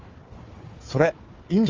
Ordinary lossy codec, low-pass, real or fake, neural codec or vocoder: Opus, 32 kbps; 7.2 kHz; fake; codec, 16 kHz, 4 kbps, FunCodec, trained on Chinese and English, 50 frames a second